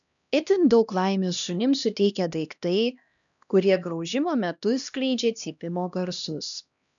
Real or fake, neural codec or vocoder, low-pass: fake; codec, 16 kHz, 1 kbps, X-Codec, HuBERT features, trained on LibriSpeech; 7.2 kHz